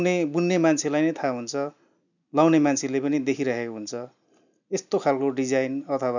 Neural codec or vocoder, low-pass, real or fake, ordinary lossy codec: none; 7.2 kHz; real; none